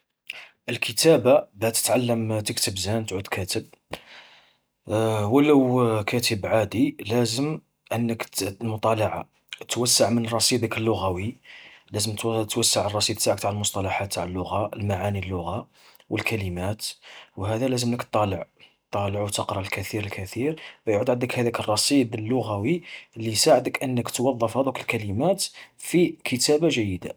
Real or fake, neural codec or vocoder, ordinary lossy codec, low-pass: real; none; none; none